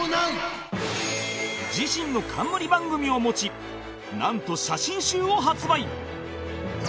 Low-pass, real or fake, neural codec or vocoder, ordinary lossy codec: none; real; none; none